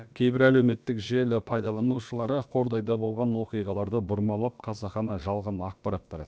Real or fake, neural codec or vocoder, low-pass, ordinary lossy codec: fake; codec, 16 kHz, about 1 kbps, DyCAST, with the encoder's durations; none; none